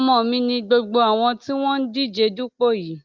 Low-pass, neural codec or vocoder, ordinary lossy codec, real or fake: 7.2 kHz; none; Opus, 24 kbps; real